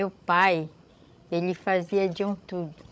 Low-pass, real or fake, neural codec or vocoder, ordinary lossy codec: none; fake; codec, 16 kHz, 16 kbps, FreqCodec, larger model; none